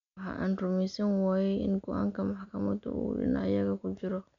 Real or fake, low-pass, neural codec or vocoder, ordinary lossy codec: real; 7.2 kHz; none; MP3, 64 kbps